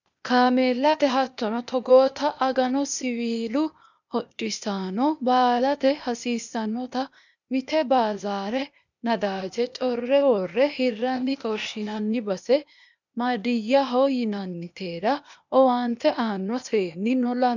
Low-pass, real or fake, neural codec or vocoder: 7.2 kHz; fake; codec, 16 kHz, 0.8 kbps, ZipCodec